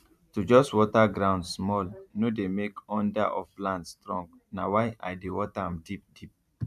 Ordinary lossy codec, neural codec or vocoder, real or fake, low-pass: none; vocoder, 44.1 kHz, 128 mel bands every 512 samples, BigVGAN v2; fake; 14.4 kHz